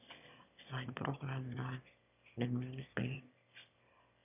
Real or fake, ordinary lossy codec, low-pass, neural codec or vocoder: fake; none; 3.6 kHz; autoencoder, 22.05 kHz, a latent of 192 numbers a frame, VITS, trained on one speaker